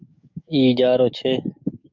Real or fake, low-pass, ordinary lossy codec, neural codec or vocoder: fake; 7.2 kHz; MP3, 64 kbps; codec, 44.1 kHz, 7.8 kbps, DAC